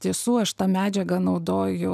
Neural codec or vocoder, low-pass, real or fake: none; 14.4 kHz; real